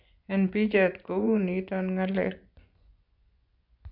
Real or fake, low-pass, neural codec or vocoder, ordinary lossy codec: fake; 5.4 kHz; vocoder, 24 kHz, 100 mel bands, Vocos; none